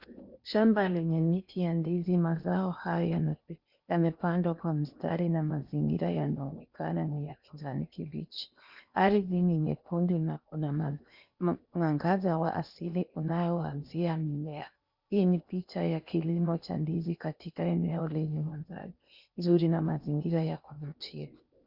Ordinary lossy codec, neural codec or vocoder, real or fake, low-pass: Opus, 64 kbps; codec, 16 kHz in and 24 kHz out, 0.8 kbps, FocalCodec, streaming, 65536 codes; fake; 5.4 kHz